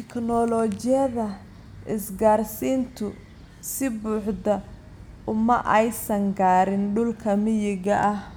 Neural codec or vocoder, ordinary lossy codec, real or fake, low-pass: none; none; real; none